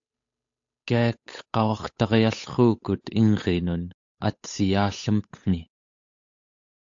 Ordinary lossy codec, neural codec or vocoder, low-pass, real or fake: AAC, 64 kbps; codec, 16 kHz, 8 kbps, FunCodec, trained on Chinese and English, 25 frames a second; 7.2 kHz; fake